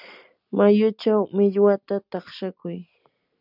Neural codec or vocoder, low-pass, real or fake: none; 5.4 kHz; real